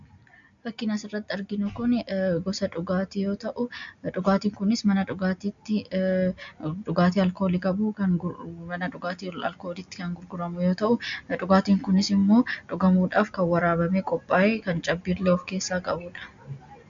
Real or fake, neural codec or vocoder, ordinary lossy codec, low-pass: real; none; MP3, 64 kbps; 7.2 kHz